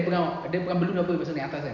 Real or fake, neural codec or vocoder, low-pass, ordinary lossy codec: real; none; 7.2 kHz; none